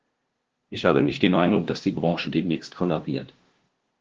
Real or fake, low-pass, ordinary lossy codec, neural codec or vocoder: fake; 7.2 kHz; Opus, 16 kbps; codec, 16 kHz, 0.5 kbps, FunCodec, trained on LibriTTS, 25 frames a second